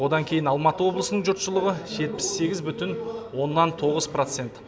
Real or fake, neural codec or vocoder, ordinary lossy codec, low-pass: real; none; none; none